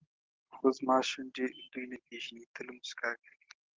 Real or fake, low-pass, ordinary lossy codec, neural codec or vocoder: real; 7.2 kHz; Opus, 16 kbps; none